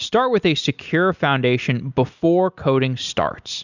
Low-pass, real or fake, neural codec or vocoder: 7.2 kHz; real; none